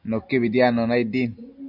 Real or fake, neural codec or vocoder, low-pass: real; none; 5.4 kHz